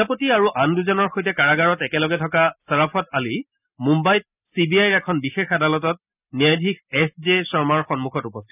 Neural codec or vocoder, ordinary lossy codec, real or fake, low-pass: none; none; real; 3.6 kHz